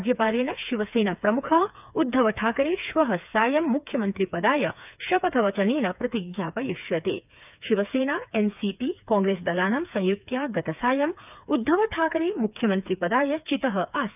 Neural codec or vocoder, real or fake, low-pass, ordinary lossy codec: codec, 16 kHz, 4 kbps, FreqCodec, smaller model; fake; 3.6 kHz; none